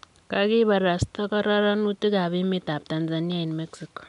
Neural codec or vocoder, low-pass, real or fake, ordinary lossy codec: none; 10.8 kHz; real; none